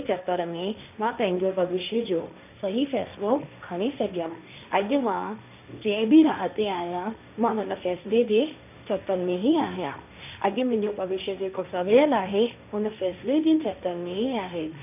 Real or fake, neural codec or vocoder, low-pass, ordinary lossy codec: fake; codec, 16 kHz, 1.1 kbps, Voila-Tokenizer; 3.6 kHz; none